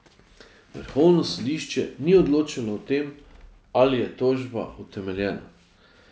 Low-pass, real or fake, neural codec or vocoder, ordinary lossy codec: none; real; none; none